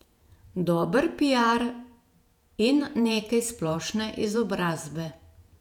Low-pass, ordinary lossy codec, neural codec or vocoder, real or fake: 19.8 kHz; none; vocoder, 48 kHz, 128 mel bands, Vocos; fake